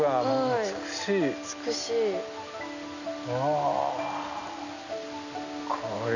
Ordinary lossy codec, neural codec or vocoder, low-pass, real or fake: none; none; 7.2 kHz; real